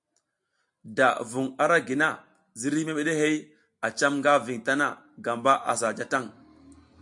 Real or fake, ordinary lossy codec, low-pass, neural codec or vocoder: real; MP3, 64 kbps; 10.8 kHz; none